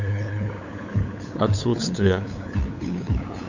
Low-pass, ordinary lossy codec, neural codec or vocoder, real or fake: 7.2 kHz; Opus, 64 kbps; codec, 16 kHz, 8 kbps, FunCodec, trained on LibriTTS, 25 frames a second; fake